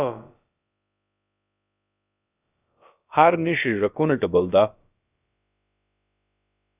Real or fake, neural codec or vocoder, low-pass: fake; codec, 16 kHz, about 1 kbps, DyCAST, with the encoder's durations; 3.6 kHz